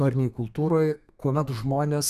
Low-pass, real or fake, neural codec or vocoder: 14.4 kHz; fake; codec, 32 kHz, 1.9 kbps, SNAC